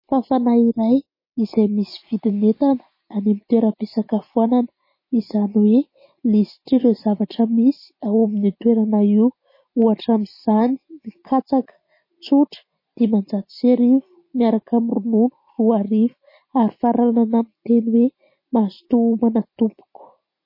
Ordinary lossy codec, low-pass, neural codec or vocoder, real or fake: MP3, 24 kbps; 5.4 kHz; none; real